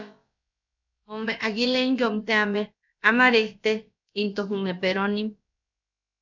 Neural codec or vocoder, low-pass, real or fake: codec, 16 kHz, about 1 kbps, DyCAST, with the encoder's durations; 7.2 kHz; fake